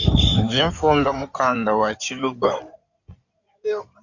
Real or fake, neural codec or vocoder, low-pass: fake; codec, 16 kHz in and 24 kHz out, 2.2 kbps, FireRedTTS-2 codec; 7.2 kHz